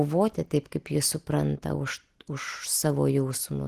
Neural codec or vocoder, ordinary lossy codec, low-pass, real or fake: none; Opus, 16 kbps; 14.4 kHz; real